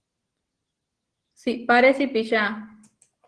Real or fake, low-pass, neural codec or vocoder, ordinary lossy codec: real; 9.9 kHz; none; Opus, 16 kbps